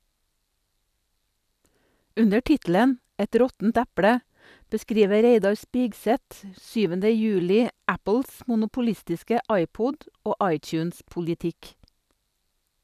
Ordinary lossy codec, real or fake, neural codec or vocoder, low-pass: none; real; none; 14.4 kHz